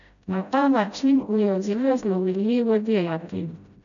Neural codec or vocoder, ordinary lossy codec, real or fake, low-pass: codec, 16 kHz, 0.5 kbps, FreqCodec, smaller model; none; fake; 7.2 kHz